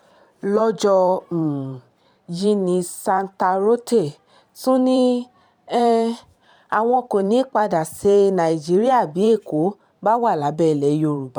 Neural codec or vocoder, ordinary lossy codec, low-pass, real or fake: vocoder, 44.1 kHz, 128 mel bands every 512 samples, BigVGAN v2; none; 19.8 kHz; fake